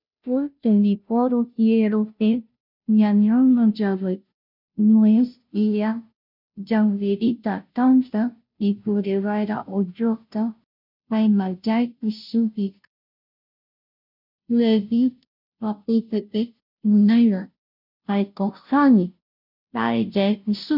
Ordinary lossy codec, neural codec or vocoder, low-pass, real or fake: none; codec, 16 kHz, 0.5 kbps, FunCodec, trained on Chinese and English, 25 frames a second; 5.4 kHz; fake